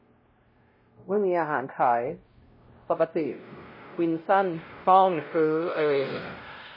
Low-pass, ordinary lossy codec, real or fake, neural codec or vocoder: 5.4 kHz; MP3, 24 kbps; fake; codec, 16 kHz, 0.5 kbps, X-Codec, WavLM features, trained on Multilingual LibriSpeech